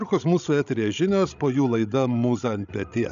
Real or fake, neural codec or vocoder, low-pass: fake; codec, 16 kHz, 16 kbps, FreqCodec, larger model; 7.2 kHz